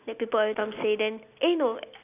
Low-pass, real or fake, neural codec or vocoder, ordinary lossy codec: 3.6 kHz; real; none; none